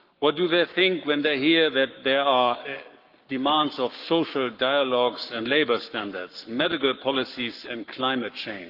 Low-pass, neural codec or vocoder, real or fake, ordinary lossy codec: 5.4 kHz; codec, 44.1 kHz, 7.8 kbps, Pupu-Codec; fake; Opus, 24 kbps